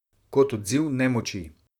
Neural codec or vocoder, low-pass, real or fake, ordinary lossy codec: vocoder, 44.1 kHz, 128 mel bands, Pupu-Vocoder; 19.8 kHz; fake; none